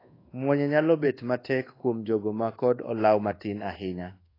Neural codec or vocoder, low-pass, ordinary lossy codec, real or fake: codec, 24 kHz, 1.2 kbps, DualCodec; 5.4 kHz; AAC, 24 kbps; fake